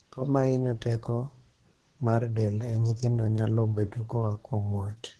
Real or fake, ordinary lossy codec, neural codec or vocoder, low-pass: fake; Opus, 16 kbps; codec, 24 kHz, 1 kbps, SNAC; 10.8 kHz